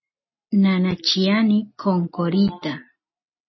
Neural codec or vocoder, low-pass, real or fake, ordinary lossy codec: none; 7.2 kHz; real; MP3, 24 kbps